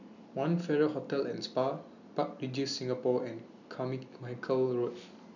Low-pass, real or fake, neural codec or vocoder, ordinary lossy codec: 7.2 kHz; real; none; none